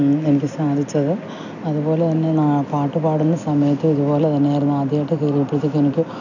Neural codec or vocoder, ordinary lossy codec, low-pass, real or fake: none; none; 7.2 kHz; real